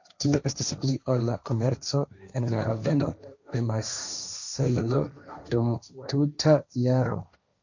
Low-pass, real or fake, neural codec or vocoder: 7.2 kHz; fake; codec, 16 kHz, 1.1 kbps, Voila-Tokenizer